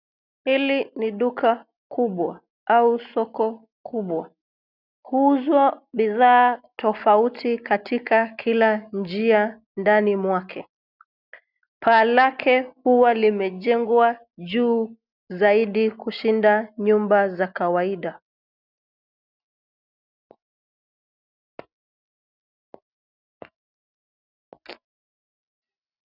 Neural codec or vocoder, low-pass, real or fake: none; 5.4 kHz; real